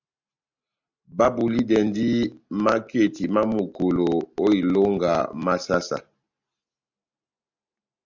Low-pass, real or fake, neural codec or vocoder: 7.2 kHz; real; none